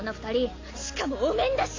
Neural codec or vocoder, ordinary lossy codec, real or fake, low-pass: none; AAC, 32 kbps; real; 7.2 kHz